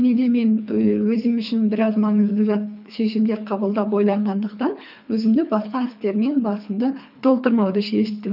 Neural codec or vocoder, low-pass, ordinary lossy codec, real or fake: codec, 24 kHz, 3 kbps, HILCodec; 5.4 kHz; none; fake